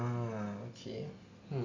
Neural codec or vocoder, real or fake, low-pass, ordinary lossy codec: none; real; 7.2 kHz; MP3, 64 kbps